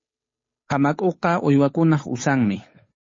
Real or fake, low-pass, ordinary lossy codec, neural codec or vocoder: fake; 7.2 kHz; MP3, 32 kbps; codec, 16 kHz, 8 kbps, FunCodec, trained on Chinese and English, 25 frames a second